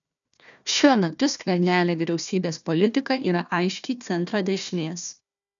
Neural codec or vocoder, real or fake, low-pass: codec, 16 kHz, 1 kbps, FunCodec, trained on Chinese and English, 50 frames a second; fake; 7.2 kHz